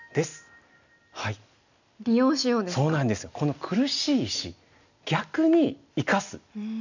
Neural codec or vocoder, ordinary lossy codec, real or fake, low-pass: none; none; real; 7.2 kHz